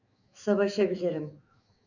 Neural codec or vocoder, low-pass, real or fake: autoencoder, 48 kHz, 128 numbers a frame, DAC-VAE, trained on Japanese speech; 7.2 kHz; fake